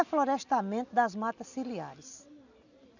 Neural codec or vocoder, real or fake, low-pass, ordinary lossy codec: none; real; 7.2 kHz; none